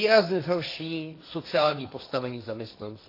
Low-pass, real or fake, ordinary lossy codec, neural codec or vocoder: 5.4 kHz; fake; AAC, 32 kbps; codec, 16 kHz, 1.1 kbps, Voila-Tokenizer